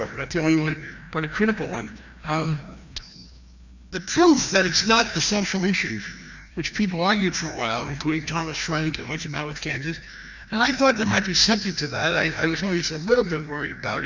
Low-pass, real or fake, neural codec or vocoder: 7.2 kHz; fake; codec, 16 kHz, 1 kbps, FreqCodec, larger model